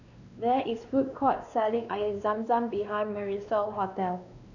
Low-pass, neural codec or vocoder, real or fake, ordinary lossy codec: 7.2 kHz; codec, 16 kHz, 2 kbps, X-Codec, WavLM features, trained on Multilingual LibriSpeech; fake; none